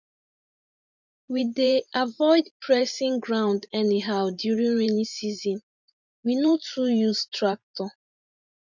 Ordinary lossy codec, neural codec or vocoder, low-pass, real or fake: none; vocoder, 44.1 kHz, 128 mel bands every 512 samples, BigVGAN v2; 7.2 kHz; fake